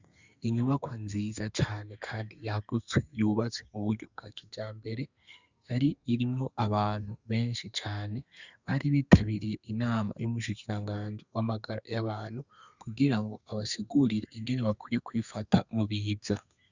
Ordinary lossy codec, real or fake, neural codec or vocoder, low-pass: Opus, 64 kbps; fake; codec, 32 kHz, 1.9 kbps, SNAC; 7.2 kHz